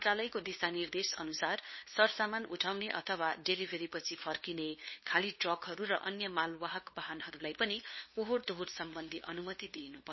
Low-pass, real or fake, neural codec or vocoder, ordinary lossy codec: 7.2 kHz; fake; codec, 16 kHz, 2 kbps, FunCodec, trained on LibriTTS, 25 frames a second; MP3, 24 kbps